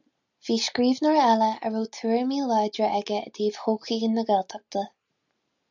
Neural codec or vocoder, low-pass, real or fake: none; 7.2 kHz; real